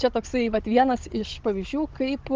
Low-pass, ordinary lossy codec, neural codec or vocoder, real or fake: 7.2 kHz; Opus, 16 kbps; codec, 16 kHz, 16 kbps, FunCodec, trained on Chinese and English, 50 frames a second; fake